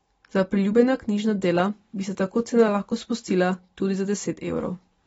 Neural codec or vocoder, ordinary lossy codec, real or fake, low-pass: none; AAC, 24 kbps; real; 19.8 kHz